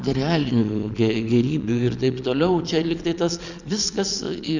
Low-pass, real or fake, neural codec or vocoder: 7.2 kHz; fake; vocoder, 22.05 kHz, 80 mel bands, Vocos